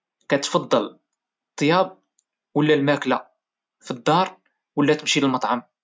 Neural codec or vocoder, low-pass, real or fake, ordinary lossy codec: none; none; real; none